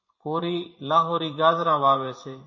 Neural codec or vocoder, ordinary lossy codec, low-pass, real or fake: vocoder, 24 kHz, 100 mel bands, Vocos; MP3, 32 kbps; 7.2 kHz; fake